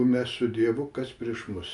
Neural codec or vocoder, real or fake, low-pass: none; real; 10.8 kHz